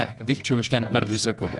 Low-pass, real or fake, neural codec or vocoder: 10.8 kHz; fake; codec, 24 kHz, 0.9 kbps, WavTokenizer, medium music audio release